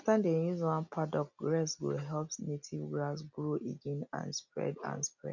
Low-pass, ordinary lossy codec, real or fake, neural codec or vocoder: 7.2 kHz; none; real; none